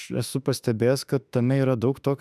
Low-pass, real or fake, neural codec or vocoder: 14.4 kHz; fake; autoencoder, 48 kHz, 32 numbers a frame, DAC-VAE, trained on Japanese speech